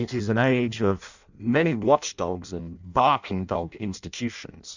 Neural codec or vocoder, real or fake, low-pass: codec, 16 kHz in and 24 kHz out, 0.6 kbps, FireRedTTS-2 codec; fake; 7.2 kHz